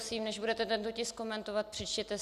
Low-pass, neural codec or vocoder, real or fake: 14.4 kHz; none; real